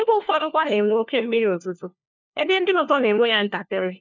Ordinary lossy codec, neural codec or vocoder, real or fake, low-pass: none; codec, 16 kHz, 1 kbps, FunCodec, trained on LibriTTS, 50 frames a second; fake; 7.2 kHz